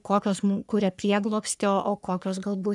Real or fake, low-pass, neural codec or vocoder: fake; 10.8 kHz; codec, 44.1 kHz, 3.4 kbps, Pupu-Codec